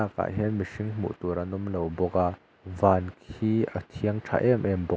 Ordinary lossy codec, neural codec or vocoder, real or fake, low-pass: none; none; real; none